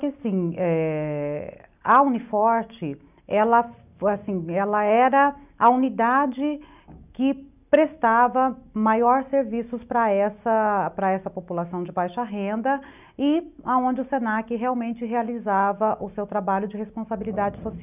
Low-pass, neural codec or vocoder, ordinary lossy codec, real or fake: 3.6 kHz; none; none; real